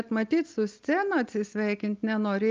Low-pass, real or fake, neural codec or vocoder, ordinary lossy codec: 7.2 kHz; real; none; Opus, 24 kbps